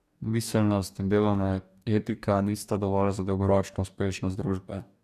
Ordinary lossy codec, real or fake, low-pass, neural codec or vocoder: none; fake; 14.4 kHz; codec, 44.1 kHz, 2.6 kbps, DAC